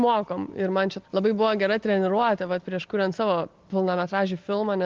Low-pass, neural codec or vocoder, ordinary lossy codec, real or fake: 7.2 kHz; none; Opus, 32 kbps; real